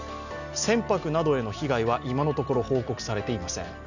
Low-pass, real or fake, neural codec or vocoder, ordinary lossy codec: 7.2 kHz; real; none; none